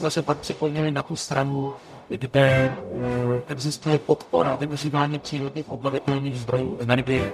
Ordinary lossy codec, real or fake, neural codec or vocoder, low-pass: MP3, 96 kbps; fake; codec, 44.1 kHz, 0.9 kbps, DAC; 14.4 kHz